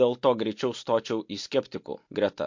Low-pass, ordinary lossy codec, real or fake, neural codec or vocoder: 7.2 kHz; MP3, 48 kbps; real; none